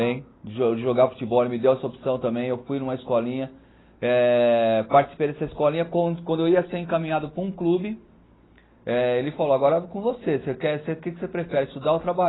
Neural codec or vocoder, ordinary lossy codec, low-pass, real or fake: none; AAC, 16 kbps; 7.2 kHz; real